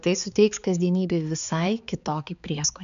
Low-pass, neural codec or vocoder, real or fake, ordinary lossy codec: 7.2 kHz; codec, 16 kHz, 4 kbps, X-Codec, HuBERT features, trained on balanced general audio; fake; MP3, 96 kbps